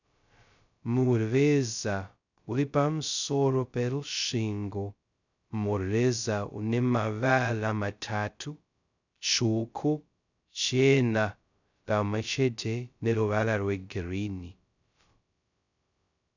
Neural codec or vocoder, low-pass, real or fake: codec, 16 kHz, 0.2 kbps, FocalCodec; 7.2 kHz; fake